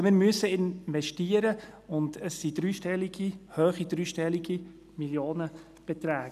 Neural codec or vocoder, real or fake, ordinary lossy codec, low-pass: none; real; none; 14.4 kHz